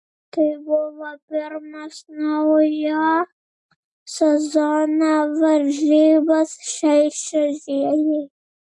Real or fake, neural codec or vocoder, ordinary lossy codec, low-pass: real; none; MP3, 64 kbps; 10.8 kHz